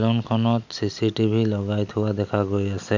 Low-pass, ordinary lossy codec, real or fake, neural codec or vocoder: 7.2 kHz; none; real; none